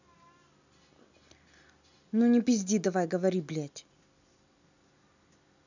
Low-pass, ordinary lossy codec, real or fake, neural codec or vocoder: 7.2 kHz; none; real; none